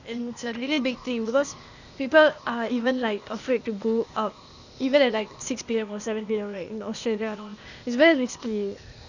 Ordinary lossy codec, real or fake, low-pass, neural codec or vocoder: none; fake; 7.2 kHz; codec, 16 kHz, 0.8 kbps, ZipCodec